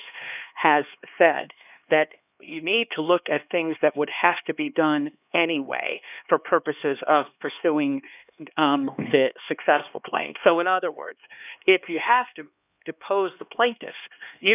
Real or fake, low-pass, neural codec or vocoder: fake; 3.6 kHz; codec, 16 kHz, 2 kbps, X-Codec, HuBERT features, trained on LibriSpeech